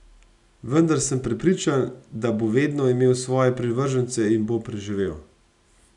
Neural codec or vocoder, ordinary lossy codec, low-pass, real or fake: none; none; 10.8 kHz; real